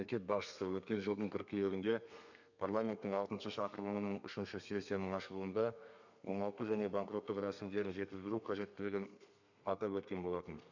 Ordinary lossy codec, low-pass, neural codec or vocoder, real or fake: none; 7.2 kHz; codec, 32 kHz, 1.9 kbps, SNAC; fake